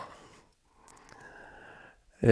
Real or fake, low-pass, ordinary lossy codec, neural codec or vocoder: fake; none; none; vocoder, 22.05 kHz, 80 mel bands, WaveNeXt